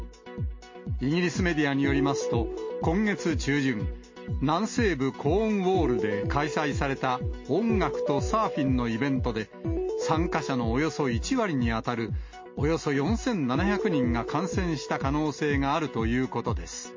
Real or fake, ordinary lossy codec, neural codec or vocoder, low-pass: real; MP3, 32 kbps; none; 7.2 kHz